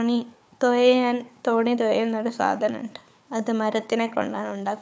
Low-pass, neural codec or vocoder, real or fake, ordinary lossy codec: none; codec, 16 kHz, 4 kbps, FunCodec, trained on Chinese and English, 50 frames a second; fake; none